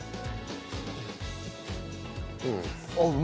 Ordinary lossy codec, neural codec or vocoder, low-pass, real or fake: none; none; none; real